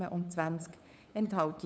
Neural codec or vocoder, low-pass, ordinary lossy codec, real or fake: codec, 16 kHz, 8 kbps, FunCodec, trained on LibriTTS, 25 frames a second; none; none; fake